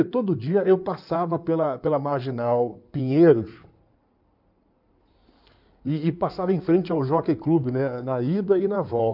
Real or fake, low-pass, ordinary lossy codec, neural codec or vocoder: fake; 5.4 kHz; none; codec, 16 kHz in and 24 kHz out, 2.2 kbps, FireRedTTS-2 codec